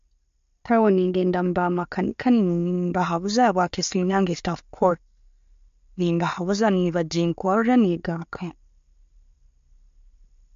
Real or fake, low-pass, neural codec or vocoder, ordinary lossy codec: real; 7.2 kHz; none; MP3, 48 kbps